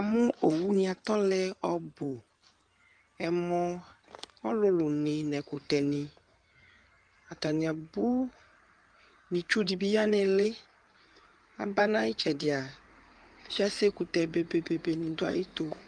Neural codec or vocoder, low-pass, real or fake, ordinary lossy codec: codec, 16 kHz in and 24 kHz out, 2.2 kbps, FireRedTTS-2 codec; 9.9 kHz; fake; Opus, 32 kbps